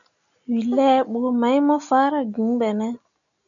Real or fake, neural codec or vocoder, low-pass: real; none; 7.2 kHz